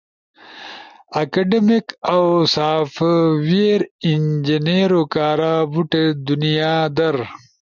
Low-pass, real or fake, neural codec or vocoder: 7.2 kHz; real; none